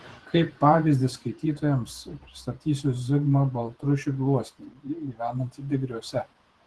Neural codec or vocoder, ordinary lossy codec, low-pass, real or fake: vocoder, 48 kHz, 128 mel bands, Vocos; Opus, 16 kbps; 10.8 kHz; fake